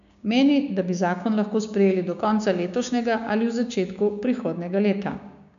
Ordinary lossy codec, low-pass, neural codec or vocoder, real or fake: none; 7.2 kHz; codec, 16 kHz, 6 kbps, DAC; fake